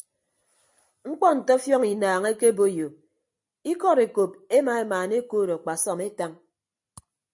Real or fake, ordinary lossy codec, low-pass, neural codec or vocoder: real; MP3, 48 kbps; 10.8 kHz; none